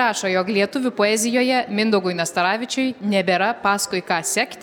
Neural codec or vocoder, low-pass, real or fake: none; 19.8 kHz; real